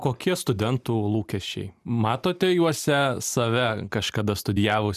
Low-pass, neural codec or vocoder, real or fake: 14.4 kHz; none; real